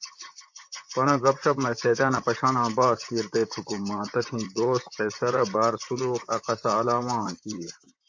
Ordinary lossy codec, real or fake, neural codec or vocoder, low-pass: MP3, 48 kbps; fake; vocoder, 44.1 kHz, 128 mel bands, Pupu-Vocoder; 7.2 kHz